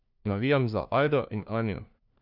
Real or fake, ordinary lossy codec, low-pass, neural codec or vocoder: fake; none; 5.4 kHz; codec, 16 kHz, 1 kbps, FunCodec, trained on LibriTTS, 50 frames a second